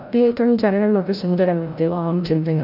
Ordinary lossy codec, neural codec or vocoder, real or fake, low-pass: none; codec, 16 kHz, 0.5 kbps, FreqCodec, larger model; fake; 5.4 kHz